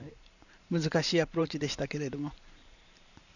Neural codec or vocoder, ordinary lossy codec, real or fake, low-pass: vocoder, 44.1 kHz, 128 mel bands every 512 samples, BigVGAN v2; none; fake; 7.2 kHz